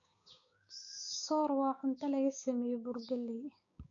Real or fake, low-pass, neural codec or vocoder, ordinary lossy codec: fake; 7.2 kHz; codec, 16 kHz, 6 kbps, DAC; none